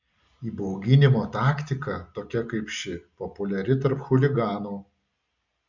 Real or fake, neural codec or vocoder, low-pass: real; none; 7.2 kHz